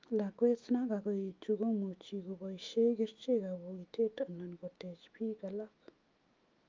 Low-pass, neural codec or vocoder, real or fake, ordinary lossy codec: 7.2 kHz; autoencoder, 48 kHz, 128 numbers a frame, DAC-VAE, trained on Japanese speech; fake; Opus, 32 kbps